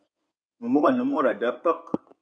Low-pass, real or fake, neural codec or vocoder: 9.9 kHz; fake; codec, 16 kHz in and 24 kHz out, 2.2 kbps, FireRedTTS-2 codec